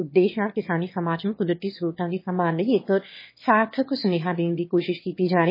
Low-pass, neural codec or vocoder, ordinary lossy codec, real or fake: 5.4 kHz; autoencoder, 22.05 kHz, a latent of 192 numbers a frame, VITS, trained on one speaker; MP3, 24 kbps; fake